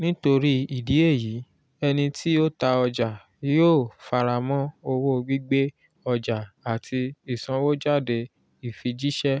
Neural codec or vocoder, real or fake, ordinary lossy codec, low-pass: none; real; none; none